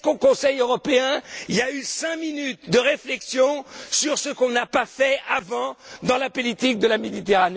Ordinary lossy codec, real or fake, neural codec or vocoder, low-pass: none; real; none; none